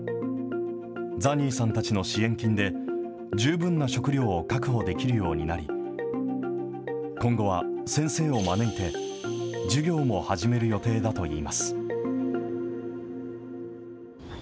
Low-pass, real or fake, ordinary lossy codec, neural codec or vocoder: none; real; none; none